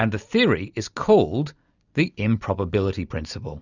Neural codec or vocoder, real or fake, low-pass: none; real; 7.2 kHz